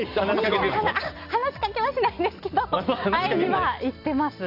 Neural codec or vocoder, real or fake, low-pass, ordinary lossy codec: none; real; 5.4 kHz; none